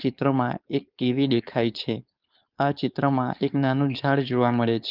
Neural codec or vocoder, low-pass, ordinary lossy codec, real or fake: codec, 16 kHz, 4.8 kbps, FACodec; 5.4 kHz; Opus, 24 kbps; fake